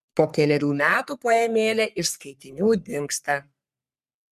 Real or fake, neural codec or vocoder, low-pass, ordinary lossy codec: fake; codec, 44.1 kHz, 3.4 kbps, Pupu-Codec; 14.4 kHz; MP3, 96 kbps